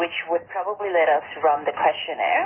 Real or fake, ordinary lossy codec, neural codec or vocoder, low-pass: real; Opus, 24 kbps; none; 3.6 kHz